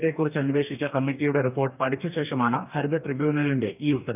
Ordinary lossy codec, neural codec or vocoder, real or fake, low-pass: none; codec, 44.1 kHz, 2.6 kbps, DAC; fake; 3.6 kHz